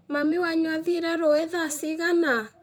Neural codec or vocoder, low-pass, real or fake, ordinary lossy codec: vocoder, 44.1 kHz, 128 mel bands, Pupu-Vocoder; none; fake; none